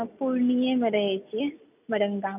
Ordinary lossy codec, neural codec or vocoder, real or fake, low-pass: none; none; real; 3.6 kHz